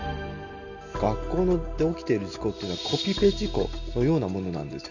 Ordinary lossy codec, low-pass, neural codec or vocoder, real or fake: none; 7.2 kHz; none; real